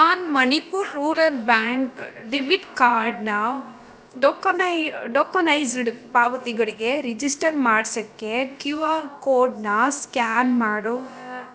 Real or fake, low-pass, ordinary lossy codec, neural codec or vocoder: fake; none; none; codec, 16 kHz, about 1 kbps, DyCAST, with the encoder's durations